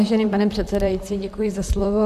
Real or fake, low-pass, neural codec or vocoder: fake; 14.4 kHz; vocoder, 44.1 kHz, 128 mel bands, Pupu-Vocoder